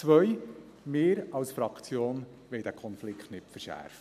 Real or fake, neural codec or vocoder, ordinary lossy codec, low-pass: real; none; none; 14.4 kHz